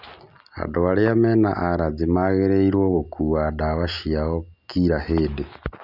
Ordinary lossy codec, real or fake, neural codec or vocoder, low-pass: none; real; none; 5.4 kHz